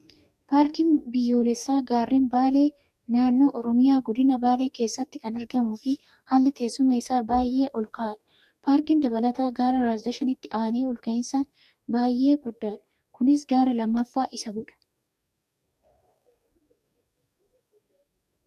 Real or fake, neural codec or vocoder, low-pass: fake; codec, 44.1 kHz, 2.6 kbps, DAC; 14.4 kHz